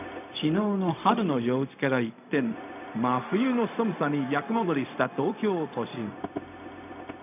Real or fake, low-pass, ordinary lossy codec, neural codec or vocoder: fake; 3.6 kHz; none; codec, 16 kHz, 0.4 kbps, LongCat-Audio-Codec